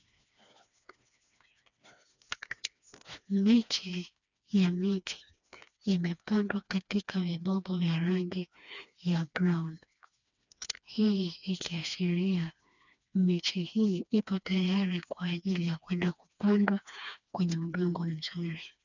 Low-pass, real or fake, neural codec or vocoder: 7.2 kHz; fake; codec, 16 kHz, 2 kbps, FreqCodec, smaller model